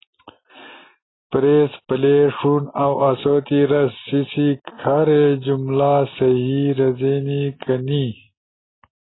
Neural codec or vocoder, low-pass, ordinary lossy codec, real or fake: none; 7.2 kHz; AAC, 16 kbps; real